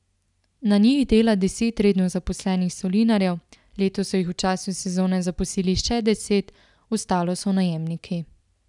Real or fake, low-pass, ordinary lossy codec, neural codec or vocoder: real; 10.8 kHz; none; none